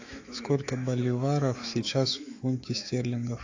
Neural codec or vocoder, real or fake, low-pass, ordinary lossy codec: autoencoder, 48 kHz, 128 numbers a frame, DAC-VAE, trained on Japanese speech; fake; 7.2 kHz; AAC, 48 kbps